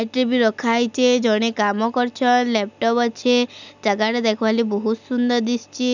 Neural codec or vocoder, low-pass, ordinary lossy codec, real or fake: none; 7.2 kHz; none; real